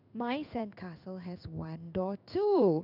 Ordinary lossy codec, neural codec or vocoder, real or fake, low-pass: none; codec, 16 kHz in and 24 kHz out, 1 kbps, XY-Tokenizer; fake; 5.4 kHz